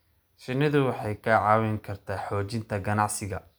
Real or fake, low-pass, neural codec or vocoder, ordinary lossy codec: real; none; none; none